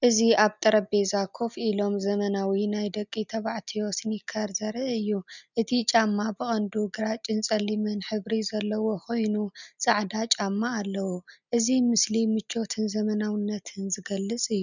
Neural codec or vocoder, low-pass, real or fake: none; 7.2 kHz; real